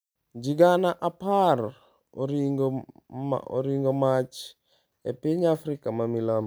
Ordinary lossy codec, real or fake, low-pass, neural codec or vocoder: none; real; none; none